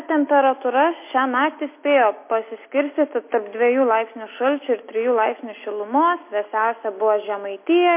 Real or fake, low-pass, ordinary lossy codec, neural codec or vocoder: real; 3.6 kHz; MP3, 24 kbps; none